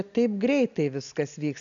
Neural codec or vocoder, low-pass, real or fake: none; 7.2 kHz; real